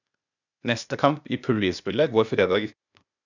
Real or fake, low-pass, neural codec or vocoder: fake; 7.2 kHz; codec, 16 kHz, 0.8 kbps, ZipCodec